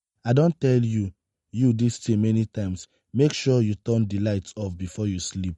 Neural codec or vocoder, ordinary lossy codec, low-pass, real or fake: none; MP3, 48 kbps; 19.8 kHz; real